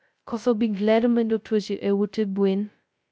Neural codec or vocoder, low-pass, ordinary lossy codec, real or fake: codec, 16 kHz, 0.2 kbps, FocalCodec; none; none; fake